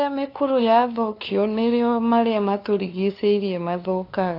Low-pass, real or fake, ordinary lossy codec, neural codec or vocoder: 5.4 kHz; fake; AAC, 32 kbps; codec, 16 kHz, 2 kbps, FunCodec, trained on LibriTTS, 25 frames a second